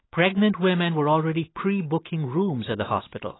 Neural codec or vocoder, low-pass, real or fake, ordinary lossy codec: none; 7.2 kHz; real; AAC, 16 kbps